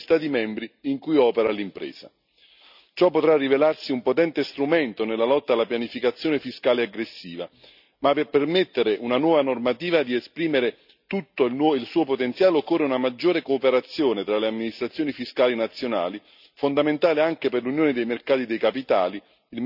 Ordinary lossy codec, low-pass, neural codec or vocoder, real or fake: none; 5.4 kHz; none; real